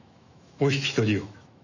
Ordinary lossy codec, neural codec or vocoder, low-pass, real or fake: none; autoencoder, 48 kHz, 128 numbers a frame, DAC-VAE, trained on Japanese speech; 7.2 kHz; fake